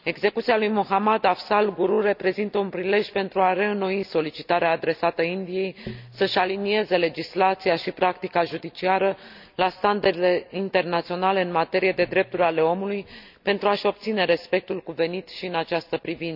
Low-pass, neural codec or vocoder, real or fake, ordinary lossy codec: 5.4 kHz; none; real; none